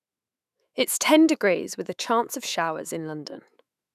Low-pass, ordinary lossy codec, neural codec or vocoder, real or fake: 14.4 kHz; none; autoencoder, 48 kHz, 128 numbers a frame, DAC-VAE, trained on Japanese speech; fake